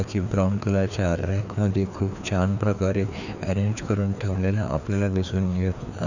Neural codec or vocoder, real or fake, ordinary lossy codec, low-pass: codec, 16 kHz, 2 kbps, FreqCodec, larger model; fake; none; 7.2 kHz